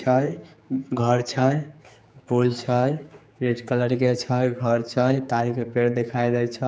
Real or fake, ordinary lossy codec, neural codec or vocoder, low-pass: fake; none; codec, 16 kHz, 4 kbps, X-Codec, HuBERT features, trained on general audio; none